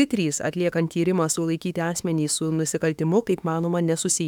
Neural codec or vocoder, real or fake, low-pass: autoencoder, 48 kHz, 32 numbers a frame, DAC-VAE, trained on Japanese speech; fake; 19.8 kHz